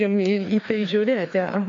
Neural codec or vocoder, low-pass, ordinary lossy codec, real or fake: codec, 16 kHz, 2 kbps, FreqCodec, larger model; 7.2 kHz; AAC, 64 kbps; fake